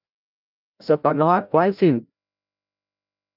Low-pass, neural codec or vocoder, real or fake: 5.4 kHz; codec, 16 kHz, 0.5 kbps, FreqCodec, larger model; fake